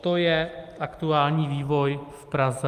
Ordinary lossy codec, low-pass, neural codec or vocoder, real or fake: Opus, 32 kbps; 14.4 kHz; none; real